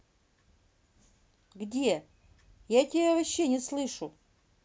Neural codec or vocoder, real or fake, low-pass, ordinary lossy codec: none; real; none; none